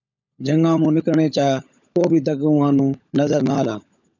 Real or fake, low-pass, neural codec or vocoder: fake; 7.2 kHz; codec, 16 kHz, 16 kbps, FunCodec, trained on LibriTTS, 50 frames a second